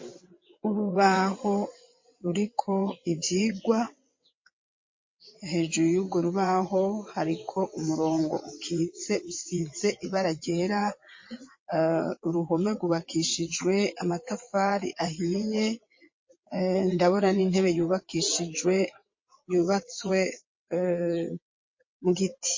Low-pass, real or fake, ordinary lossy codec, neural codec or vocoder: 7.2 kHz; fake; MP3, 32 kbps; vocoder, 22.05 kHz, 80 mel bands, WaveNeXt